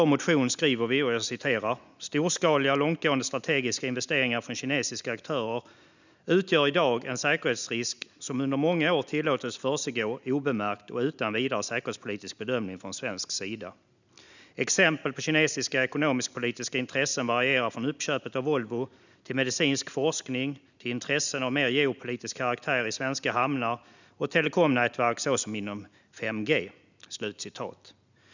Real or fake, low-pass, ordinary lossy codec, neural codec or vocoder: real; 7.2 kHz; none; none